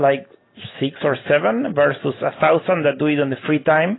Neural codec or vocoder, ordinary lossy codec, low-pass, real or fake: none; AAC, 16 kbps; 7.2 kHz; real